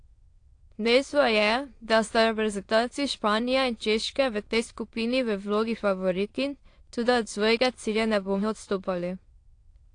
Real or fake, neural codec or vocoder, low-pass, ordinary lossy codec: fake; autoencoder, 22.05 kHz, a latent of 192 numbers a frame, VITS, trained on many speakers; 9.9 kHz; AAC, 48 kbps